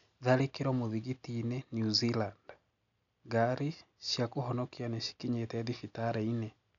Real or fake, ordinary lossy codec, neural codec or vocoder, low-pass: real; MP3, 96 kbps; none; 7.2 kHz